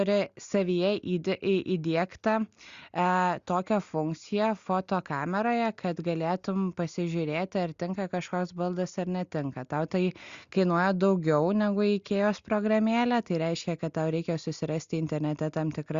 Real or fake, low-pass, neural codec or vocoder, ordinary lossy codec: real; 7.2 kHz; none; Opus, 64 kbps